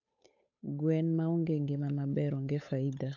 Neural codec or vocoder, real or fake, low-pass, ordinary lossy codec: codec, 16 kHz, 8 kbps, FunCodec, trained on Chinese and English, 25 frames a second; fake; 7.2 kHz; AAC, 48 kbps